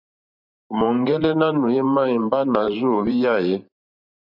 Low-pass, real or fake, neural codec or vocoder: 5.4 kHz; fake; vocoder, 44.1 kHz, 128 mel bands every 512 samples, BigVGAN v2